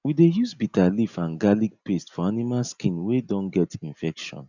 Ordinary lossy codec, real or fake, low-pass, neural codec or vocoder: none; real; 7.2 kHz; none